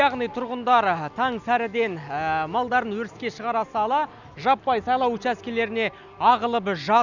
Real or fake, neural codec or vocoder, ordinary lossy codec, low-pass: real; none; none; 7.2 kHz